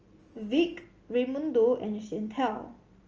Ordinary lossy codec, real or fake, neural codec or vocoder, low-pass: Opus, 24 kbps; real; none; 7.2 kHz